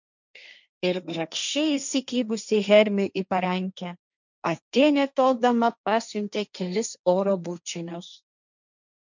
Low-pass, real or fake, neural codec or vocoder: 7.2 kHz; fake; codec, 16 kHz, 1.1 kbps, Voila-Tokenizer